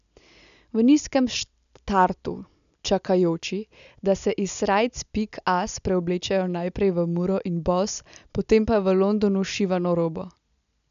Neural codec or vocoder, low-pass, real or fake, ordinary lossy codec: none; 7.2 kHz; real; none